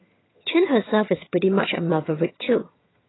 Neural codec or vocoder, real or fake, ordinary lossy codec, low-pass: vocoder, 22.05 kHz, 80 mel bands, HiFi-GAN; fake; AAC, 16 kbps; 7.2 kHz